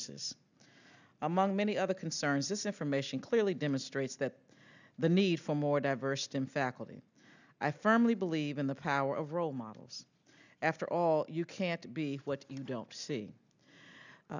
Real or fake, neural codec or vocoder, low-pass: real; none; 7.2 kHz